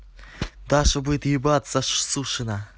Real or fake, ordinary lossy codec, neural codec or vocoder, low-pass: real; none; none; none